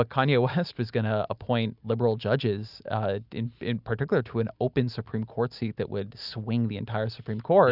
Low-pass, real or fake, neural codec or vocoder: 5.4 kHz; real; none